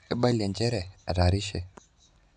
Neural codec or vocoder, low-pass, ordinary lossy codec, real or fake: none; 9.9 kHz; none; real